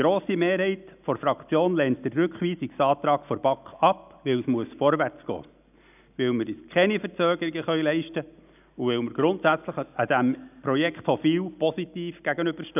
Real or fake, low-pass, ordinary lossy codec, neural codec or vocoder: real; 3.6 kHz; none; none